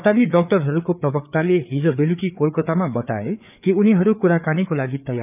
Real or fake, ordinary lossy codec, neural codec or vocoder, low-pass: fake; none; codec, 16 kHz, 4 kbps, FreqCodec, larger model; 3.6 kHz